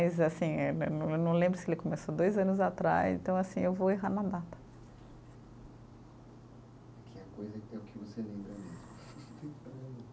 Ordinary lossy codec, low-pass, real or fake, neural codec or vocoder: none; none; real; none